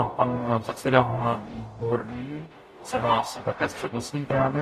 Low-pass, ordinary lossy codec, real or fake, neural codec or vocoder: 14.4 kHz; AAC, 48 kbps; fake; codec, 44.1 kHz, 0.9 kbps, DAC